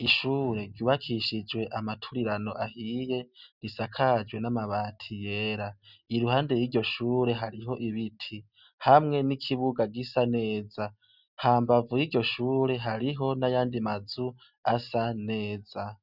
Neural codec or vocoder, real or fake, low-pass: none; real; 5.4 kHz